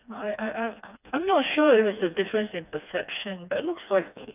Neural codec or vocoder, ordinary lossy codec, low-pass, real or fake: codec, 16 kHz, 2 kbps, FreqCodec, smaller model; none; 3.6 kHz; fake